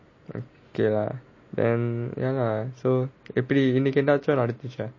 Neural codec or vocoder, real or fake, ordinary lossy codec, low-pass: none; real; MP3, 32 kbps; 7.2 kHz